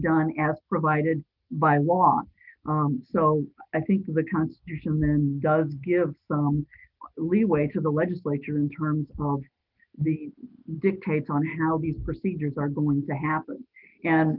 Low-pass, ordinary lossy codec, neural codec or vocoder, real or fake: 5.4 kHz; Opus, 32 kbps; none; real